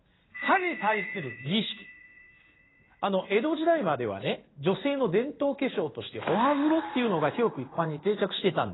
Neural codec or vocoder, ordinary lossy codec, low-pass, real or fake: codec, 16 kHz in and 24 kHz out, 1 kbps, XY-Tokenizer; AAC, 16 kbps; 7.2 kHz; fake